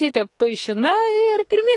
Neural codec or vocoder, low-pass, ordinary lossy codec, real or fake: codec, 44.1 kHz, 2.6 kbps, SNAC; 10.8 kHz; AAC, 48 kbps; fake